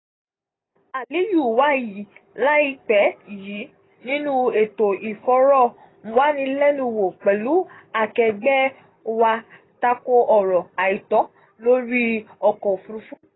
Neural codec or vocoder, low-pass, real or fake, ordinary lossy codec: none; 7.2 kHz; real; AAC, 16 kbps